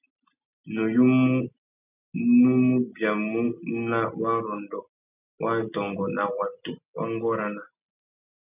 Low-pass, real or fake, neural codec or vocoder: 3.6 kHz; real; none